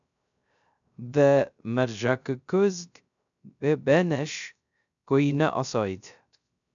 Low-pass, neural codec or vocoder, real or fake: 7.2 kHz; codec, 16 kHz, 0.3 kbps, FocalCodec; fake